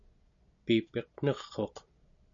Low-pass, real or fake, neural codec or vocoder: 7.2 kHz; real; none